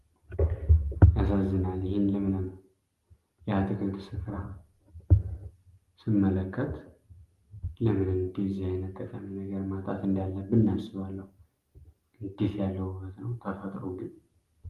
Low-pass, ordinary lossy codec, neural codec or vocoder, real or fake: 14.4 kHz; Opus, 24 kbps; none; real